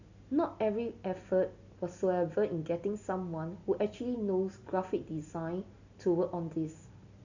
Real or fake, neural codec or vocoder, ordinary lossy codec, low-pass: real; none; none; 7.2 kHz